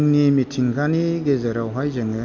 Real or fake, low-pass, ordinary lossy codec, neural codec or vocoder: real; 7.2 kHz; none; none